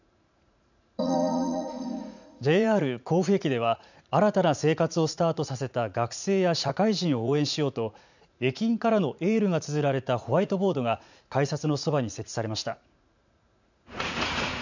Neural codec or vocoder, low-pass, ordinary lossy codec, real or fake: vocoder, 44.1 kHz, 80 mel bands, Vocos; 7.2 kHz; none; fake